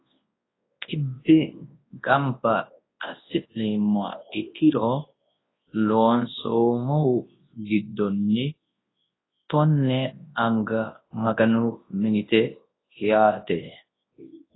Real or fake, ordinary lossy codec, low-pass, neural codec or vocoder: fake; AAC, 16 kbps; 7.2 kHz; codec, 24 kHz, 0.9 kbps, WavTokenizer, large speech release